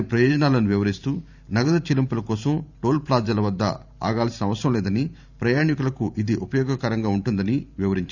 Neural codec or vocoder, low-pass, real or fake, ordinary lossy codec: vocoder, 44.1 kHz, 128 mel bands every 512 samples, BigVGAN v2; 7.2 kHz; fake; none